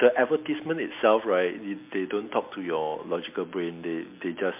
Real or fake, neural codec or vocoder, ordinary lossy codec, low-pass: real; none; MP3, 32 kbps; 3.6 kHz